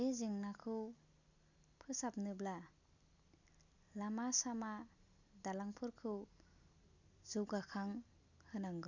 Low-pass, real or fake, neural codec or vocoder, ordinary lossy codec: 7.2 kHz; real; none; none